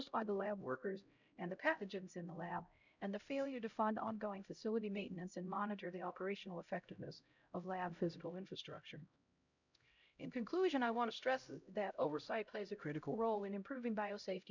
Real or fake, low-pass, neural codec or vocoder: fake; 7.2 kHz; codec, 16 kHz, 0.5 kbps, X-Codec, HuBERT features, trained on LibriSpeech